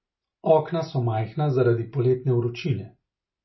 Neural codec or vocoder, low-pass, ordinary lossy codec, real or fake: none; 7.2 kHz; MP3, 24 kbps; real